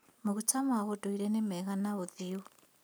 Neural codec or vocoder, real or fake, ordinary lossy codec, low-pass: none; real; none; none